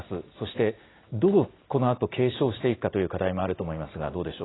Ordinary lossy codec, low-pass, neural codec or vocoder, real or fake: AAC, 16 kbps; 7.2 kHz; none; real